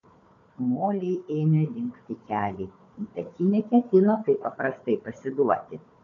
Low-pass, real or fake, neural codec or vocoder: 7.2 kHz; fake; codec, 16 kHz, 4 kbps, FunCodec, trained on Chinese and English, 50 frames a second